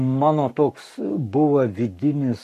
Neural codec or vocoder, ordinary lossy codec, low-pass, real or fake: codec, 44.1 kHz, 7.8 kbps, Pupu-Codec; MP3, 64 kbps; 14.4 kHz; fake